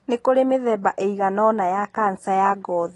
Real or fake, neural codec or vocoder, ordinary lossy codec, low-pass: real; none; AAC, 32 kbps; 10.8 kHz